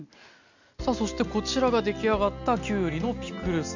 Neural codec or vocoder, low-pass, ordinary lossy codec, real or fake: none; 7.2 kHz; none; real